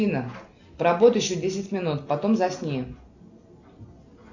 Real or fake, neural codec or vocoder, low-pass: real; none; 7.2 kHz